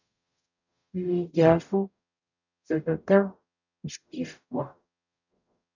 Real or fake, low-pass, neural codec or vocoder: fake; 7.2 kHz; codec, 44.1 kHz, 0.9 kbps, DAC